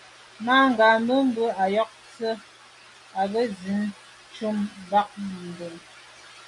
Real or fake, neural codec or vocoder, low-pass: real; none; 10.8 kHz